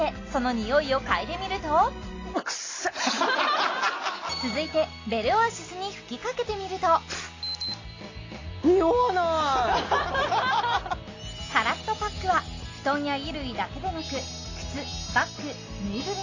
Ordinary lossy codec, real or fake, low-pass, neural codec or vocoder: AAC, 32 kbps; real; 7.2 kHz; none